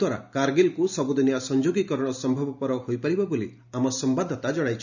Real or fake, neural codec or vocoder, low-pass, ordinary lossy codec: real; none; 7.2 kHz; none